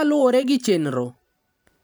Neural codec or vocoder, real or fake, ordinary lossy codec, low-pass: vocoder, 44.1 kHz, 128 mel bands every 512 samples, BigVGAN v2; fake; none; none